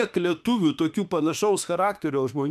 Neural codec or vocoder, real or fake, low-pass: autoencoder, 48 kHz, 32 numbers a frame, DAC-VAE, trained on Japanese speech; fake; 14.4 kHz